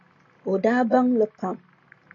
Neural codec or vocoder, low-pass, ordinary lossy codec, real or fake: none; 7.2 kHz; AAC, 32 kbps; real